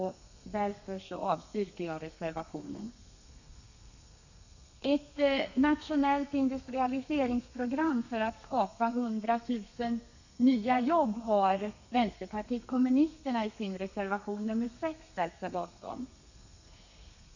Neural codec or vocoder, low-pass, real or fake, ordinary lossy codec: codec, 32 kHz, 1.9 kbps, SNAC; 7.2 kHz; fake; none